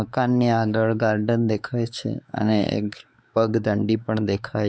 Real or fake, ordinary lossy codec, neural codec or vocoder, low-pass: fake; none; codec, 16 kHz, 4 kbps, X-Codec, WavLM features, trained on Multilingual LibriSpeech; none